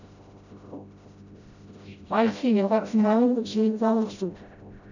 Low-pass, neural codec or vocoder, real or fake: 7.2 kHz; codec, 16 kHz, 0.5 kbps, FreqCodec, smaller model; fake